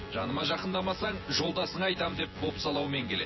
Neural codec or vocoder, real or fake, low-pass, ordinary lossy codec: vocoder, 24 kHz, 100 mel bands, Vocos; fake; 7.2 kHz; MP3, 24 kbps